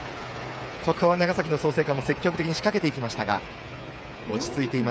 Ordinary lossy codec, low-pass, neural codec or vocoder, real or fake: none; none; codec, 16 kHz, 8 kbps, FreqCodec, smaller model; fake